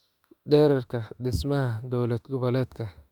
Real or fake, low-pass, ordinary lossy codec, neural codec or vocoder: fake; 19.8 kHz; Opus, 64 kbps; autoencoder, 48 kHz, 32 numbers a frame, DAC-VAE, trained on Japanese speech